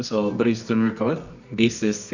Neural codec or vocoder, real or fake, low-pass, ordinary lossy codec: codec, 24 kHz, 0.9 kbps, WavTokenizer, medium music audio release; fake; 7.2 kHz; none